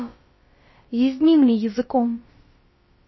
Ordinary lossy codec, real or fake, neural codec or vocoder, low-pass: MP3, 24 kbps; fake; codec, 16 kHz, about 1 kbps, DyCAST, with the encoder's durations; 7.2 kHz